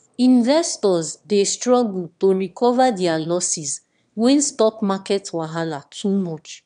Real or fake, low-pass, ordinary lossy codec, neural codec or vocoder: fake; 9.9 kHz; none; autoencoder, 22.05 kHz, a latent of 192 numbers a frame, VITS, trained on one speaker